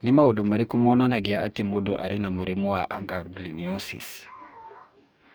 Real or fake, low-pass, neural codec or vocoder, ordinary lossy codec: fake; none; codec, 44.1 kHz, 2.6 kbps, DAC; none